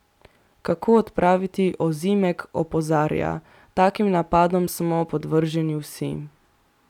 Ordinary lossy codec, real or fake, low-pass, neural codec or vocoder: none; real; 19.8 kHz; none